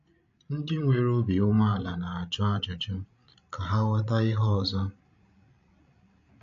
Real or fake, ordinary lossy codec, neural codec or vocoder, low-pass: fake; AAC, 64 kbps; codec, 16 kHz, 16 kbps, FreqCodec, larger model; 7.2 kHz